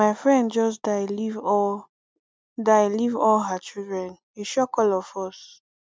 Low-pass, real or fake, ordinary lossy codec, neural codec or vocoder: none; real; none; none